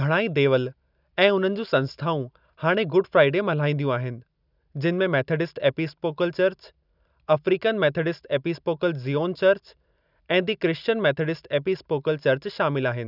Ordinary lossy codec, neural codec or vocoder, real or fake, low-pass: none; none; real; 5.4 kHz